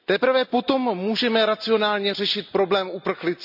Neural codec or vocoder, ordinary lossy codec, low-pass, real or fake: none; none; 5.4 kHz; real